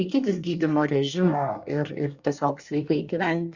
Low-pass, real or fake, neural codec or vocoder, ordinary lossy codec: 7.2 kHz; fake; codec, 24 kHz, 1 kbps, SNAC; Opus, 64 kbps